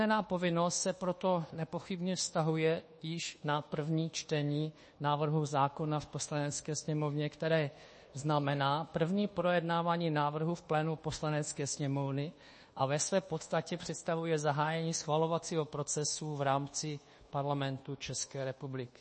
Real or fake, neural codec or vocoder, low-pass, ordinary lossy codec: fake; codec, 24 kHz, 1.2 kbps, DualCodec; 10.8 kHz; MP3, 32 kbps